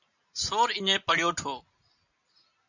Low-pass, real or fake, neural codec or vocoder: 7.2 kHz; real; none